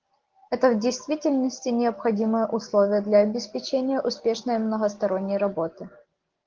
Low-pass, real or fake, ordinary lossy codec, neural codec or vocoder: 7.2 kHz; real; Opus, 16 kbps; none